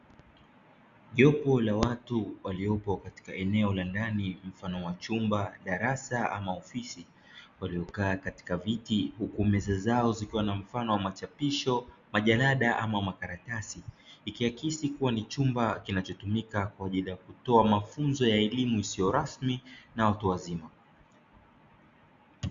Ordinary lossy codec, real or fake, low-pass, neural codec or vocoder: Opus, 64 kbps; real; 7.2 kHz; none